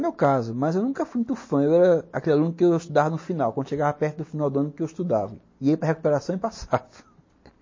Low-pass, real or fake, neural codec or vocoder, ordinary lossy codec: 7.2 kHz; real; none; MP3, 32 kbps